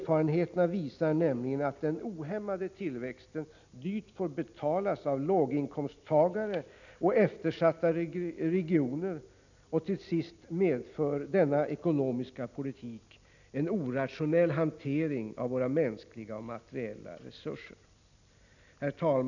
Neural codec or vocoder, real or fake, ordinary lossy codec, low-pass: none; real; none; 7.2 kHz